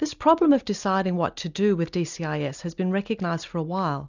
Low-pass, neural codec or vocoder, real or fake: 7.2 kHz; none; real